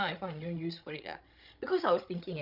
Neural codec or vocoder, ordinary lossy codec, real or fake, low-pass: codec, 16 kHz, 16 kbps, FreqCodec, larger model; none; fake; 5.4 kHz